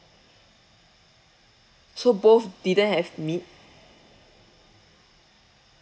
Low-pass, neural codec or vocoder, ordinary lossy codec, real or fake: none; none; none; real